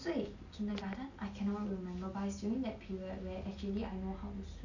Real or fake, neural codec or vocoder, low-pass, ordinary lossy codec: real; none; 7.2 kHz; none